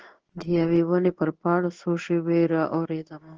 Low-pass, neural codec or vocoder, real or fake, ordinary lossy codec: 7.2 kHz; codec, 16 kHz in and 24 kHz out, 1 kbps, XY-Tokenizer; fake; Opus, 16 kbps